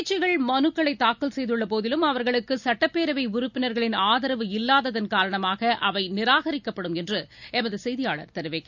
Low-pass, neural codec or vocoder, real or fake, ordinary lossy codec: 7.2 kHz; none; real; none